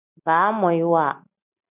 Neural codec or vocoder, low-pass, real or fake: none; 3.6 kHz; real